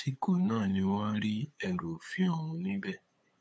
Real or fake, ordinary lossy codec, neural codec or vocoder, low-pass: fake; none; codec, 16 kHz, 8 kbps, FunCodec, trained on LibriTTS, 25 frames a second; none